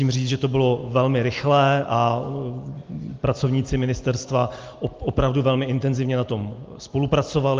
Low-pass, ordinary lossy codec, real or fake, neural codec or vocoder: 7.2 kHz; Opus, 32 kbps; real; none